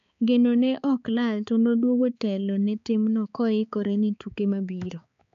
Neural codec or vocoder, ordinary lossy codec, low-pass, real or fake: codec, 16 kHz, 4 kbps, X-Codec, HuBERT features, trained on balanced general audio; MP3, 64 kbps; 7.2 kHz; fake